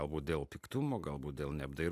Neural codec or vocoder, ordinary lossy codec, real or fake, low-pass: none; AAC, 96 kbps; real; 14.4 kHz